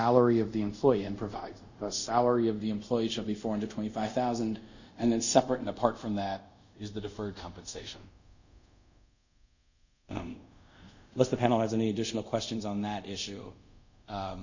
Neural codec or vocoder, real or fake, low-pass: codec, 24 kHz, 0.5 kbps, DualCodec; fake; 7.2 kHz